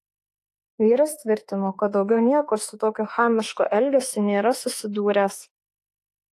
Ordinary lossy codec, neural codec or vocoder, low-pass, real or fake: AAC, 48 kbps; autoencoder, 48 kHz, 32 numbers a frame, DAC-VAE, trained on Japanese speech; 14.4 kHz; fake